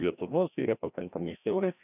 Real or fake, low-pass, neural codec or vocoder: fake; 3.6 kHz; codec, 16 kHz, 1 kbps, FreqCodec, larger model